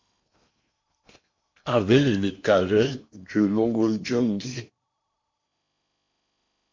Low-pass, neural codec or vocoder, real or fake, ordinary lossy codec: 7.2 kHz; codec, 16 kHz in and 24 kHz out, 0.8 kbps, FocalCodec, streaming, 65536 codes; fake; MP3, 48 kbps